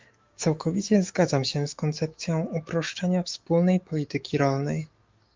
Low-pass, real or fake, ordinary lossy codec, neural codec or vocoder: 7.2 kHz; fake; Opus, 32 kbps; autoencoder, 48 kHz, 128 numbers a frame, DAC-VAE, trained on Japanese speech